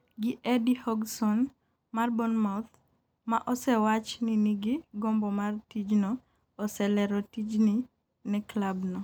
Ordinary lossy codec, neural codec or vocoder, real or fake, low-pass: none; none; real; none